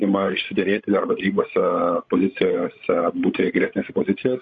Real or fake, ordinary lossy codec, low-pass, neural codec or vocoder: fake; AAC, 64 kbps; 7.2 kHz; codec, 16 kHz, 16 kbps, FreqCodec, larger model